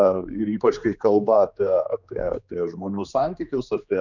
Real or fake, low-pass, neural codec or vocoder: fake; 7.2 kHz; codec, 16 kHz, 2 kbps, X-Codec, HuBERT features, trained on general audio